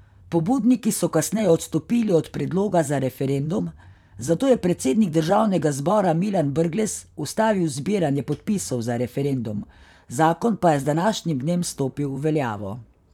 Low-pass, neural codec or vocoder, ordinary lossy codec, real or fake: 19.8 kHz; vocoder, 44.1 kHz, 128 mel bands, Pupu-Vocoder; none; fake